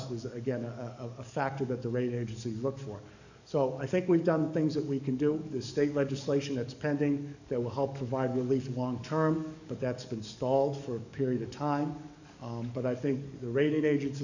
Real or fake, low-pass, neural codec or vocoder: fake; 7.2 kHz; codec, 44.1 kHz, 7.8 kbps, DAC